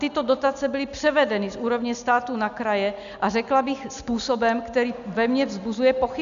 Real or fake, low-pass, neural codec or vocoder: real; 7.2 kHz; none